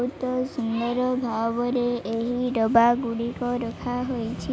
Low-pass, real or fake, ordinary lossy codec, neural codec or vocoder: none; real; none; none